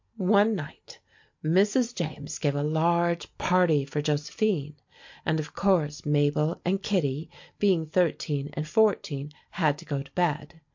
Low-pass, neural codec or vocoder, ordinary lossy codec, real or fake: 7.2 kHz; none; MP3, 48 kbps; real